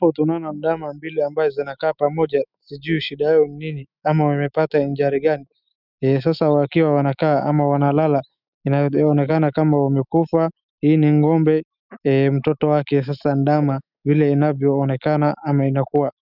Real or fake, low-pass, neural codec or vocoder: fake; 5.4 kHz; autoencoder, 48 kHz, 128 numbers a frame, DAC-VAE, trained on Japanese speech